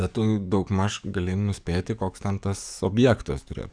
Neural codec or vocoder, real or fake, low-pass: codec, 44.1 kHz, 7.8 kbps, DAC; fake; 9.9 kHz